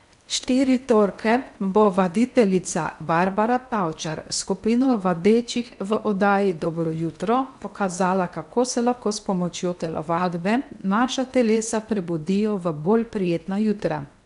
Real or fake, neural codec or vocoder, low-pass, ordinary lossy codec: fake; codec, 16 kHz in and 24 kHz out, 0.8 kbps, FocalCodec, streaming, 65536 codes; 10.8 kHz; none